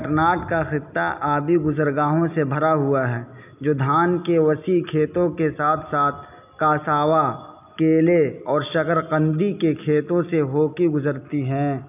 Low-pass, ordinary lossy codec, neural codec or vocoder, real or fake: 3.6 kHz; none; none; real